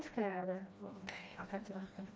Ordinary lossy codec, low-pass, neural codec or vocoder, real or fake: none; none; codec, 16 kHz, 1 kbps, FreqCodec, smaller model; fake